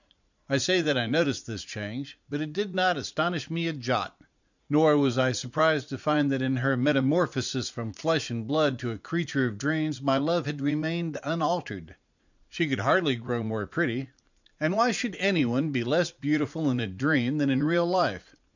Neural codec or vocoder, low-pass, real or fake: vocoder, 44.1 kHz, 80 mel bands, Vocos; 7.2 kHz; fake